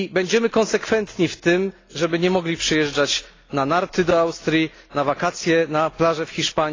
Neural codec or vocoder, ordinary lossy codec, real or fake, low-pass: none; AAC, 32 kbps; real; 7.2 kHz